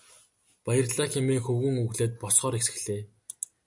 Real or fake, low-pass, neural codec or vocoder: real; 10.8 kHz; none